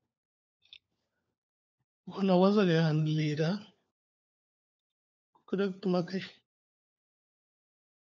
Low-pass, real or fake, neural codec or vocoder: 7.2 kHz; fake; codec, 16 kHz, 4 kbps, FunCodec, trained on LibriTTS, 50 frames a second